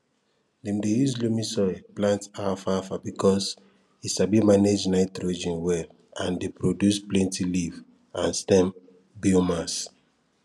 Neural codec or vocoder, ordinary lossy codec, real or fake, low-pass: none; none; real; none